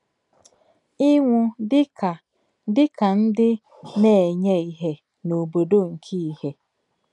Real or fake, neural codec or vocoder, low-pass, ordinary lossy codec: real; none; 10.8 kHz; none